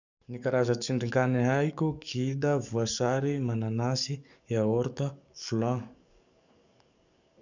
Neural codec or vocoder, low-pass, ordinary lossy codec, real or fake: codec, 44.1 kHz, 7.8 kbps, DAC; 7.2 kHz; none; fake